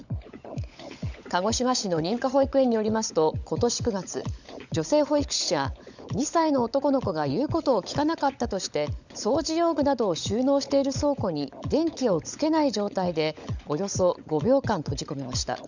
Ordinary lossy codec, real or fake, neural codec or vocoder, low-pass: none; fake; codec, 16 kHz, 16 kbps, FunCodec, trained on LibriTTS, 50 frames a second; 7.2 kHz